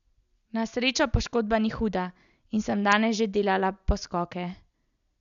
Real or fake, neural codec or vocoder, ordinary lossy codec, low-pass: real; none; none; 7.2 kHz